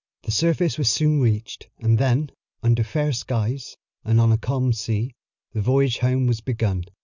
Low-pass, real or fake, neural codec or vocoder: 7.2 kHz; real; none